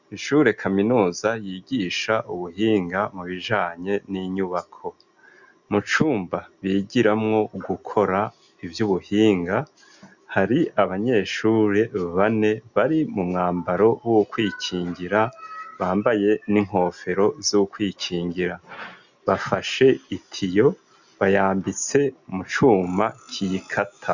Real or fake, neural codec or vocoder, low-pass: real; none; 7.2 kHz